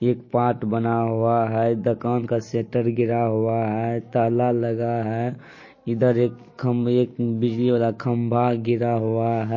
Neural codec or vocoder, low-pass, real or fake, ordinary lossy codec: none; 7.2 kHz; real; MP3, 32 kbps